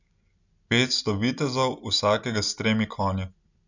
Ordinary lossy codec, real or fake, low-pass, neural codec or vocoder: none; real; 7.2 kHz; none